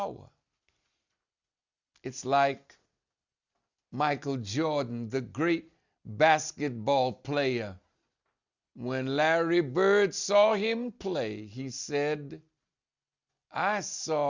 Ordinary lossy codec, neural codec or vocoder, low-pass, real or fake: Opus, 64 kbps; none; 7.2 kHz; real